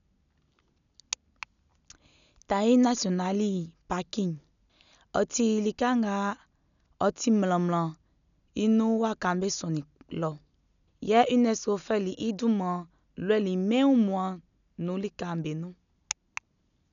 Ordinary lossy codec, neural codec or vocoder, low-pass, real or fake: none; none; 7.2 kHz; real